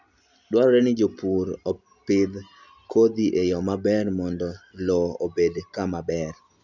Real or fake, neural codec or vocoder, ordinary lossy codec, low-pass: real; none; none; 7.2 kHz